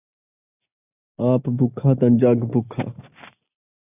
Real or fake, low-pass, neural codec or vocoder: real; 3.6 kHz; none